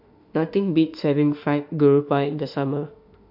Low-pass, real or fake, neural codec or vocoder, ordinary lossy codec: 5.4 kHz; fake; autoencoder, 48 kHz, 32 numbers a frame, DAC-VAE, trained on Japanese speech; AAC, 48 kbps